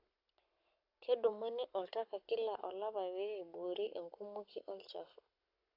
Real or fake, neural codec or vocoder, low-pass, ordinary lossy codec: fake; codec, 44.1 kHz, 7.8 kbps, Pupu-Codec; 5.4 kHz; AAC, 48 kbps